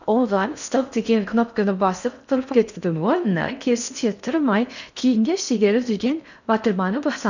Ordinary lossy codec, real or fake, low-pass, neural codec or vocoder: none; fake; 7.2 kHz; codec, 16 kHz in and 24 kHz out, 0.6 kbps, FocalCodec, streaming, 4096 codes